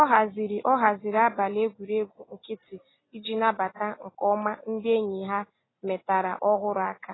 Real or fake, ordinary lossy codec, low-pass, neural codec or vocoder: real; AAC, 16 kbps; 7.2 kHz; none